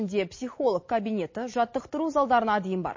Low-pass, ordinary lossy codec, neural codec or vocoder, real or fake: 7.2 kHz; MP3, 32 kbps; none; real